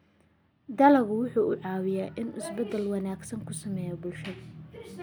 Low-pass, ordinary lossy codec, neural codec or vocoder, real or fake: none; none; none; real